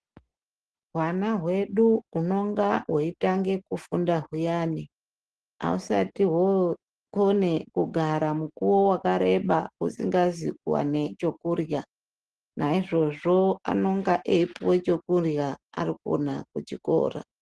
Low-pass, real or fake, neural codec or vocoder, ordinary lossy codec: 10.8 kHz; real; none; Opus, 16 kbps